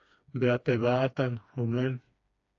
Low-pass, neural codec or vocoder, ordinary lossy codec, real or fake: 7.2 kHz; codec, 16 kHz, 2 kbps, FreqCodec, smaller model; AAC, 48 kbps; fake